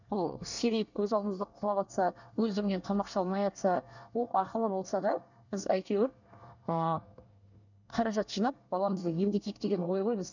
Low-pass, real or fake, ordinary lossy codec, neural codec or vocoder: 7.2 kHz; fake; none; codec, 24 kHz, 1 kbps, SNAC